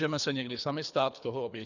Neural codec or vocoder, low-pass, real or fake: codec, 24 kHz, 3 kbps, HILCodec; 7.2 kHz; fake